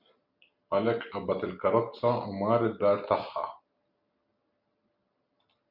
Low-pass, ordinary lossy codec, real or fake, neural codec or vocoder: 5.4 kHz; MP3, 48 kbps; real; none